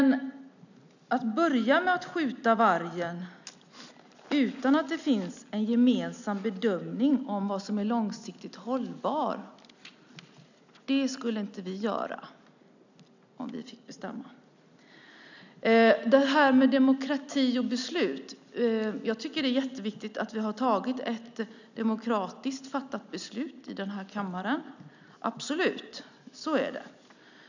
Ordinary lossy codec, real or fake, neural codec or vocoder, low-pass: none; real; none; 7.2 kHz